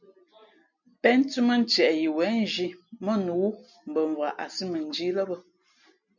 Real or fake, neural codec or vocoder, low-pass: real; none; 7.2 kHz